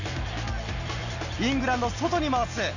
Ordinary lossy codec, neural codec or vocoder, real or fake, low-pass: none; none; real; 7.2 kHz